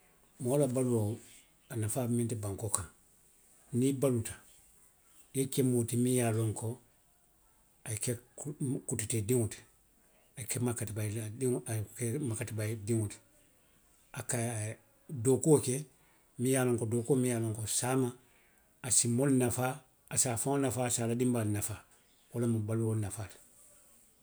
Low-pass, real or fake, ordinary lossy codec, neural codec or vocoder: none; real; none; none